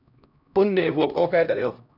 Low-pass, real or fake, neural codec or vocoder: 5.4 kHz; fake; codec, 16 kHz, 1 kbps, X-Codec, HuBERT features, trained on LibriSpeech